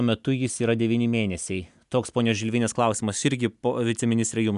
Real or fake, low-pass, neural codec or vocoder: real; 10.8 kHz; none